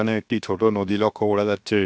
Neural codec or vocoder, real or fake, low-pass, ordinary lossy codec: codec, 16 kHz, 0.7 kbps, FocalCodec; fake; none; none